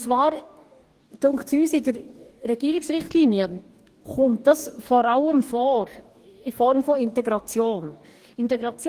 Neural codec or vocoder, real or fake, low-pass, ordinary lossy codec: codec, 44.1 kHz, 2.6 kbps, DAC; fake; 14.4 kHz; Opus, 24 kbps